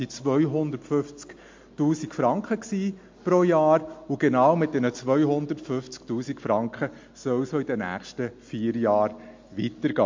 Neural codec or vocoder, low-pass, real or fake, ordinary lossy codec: none; 7.2 kHz; real; MP3, 48 kbps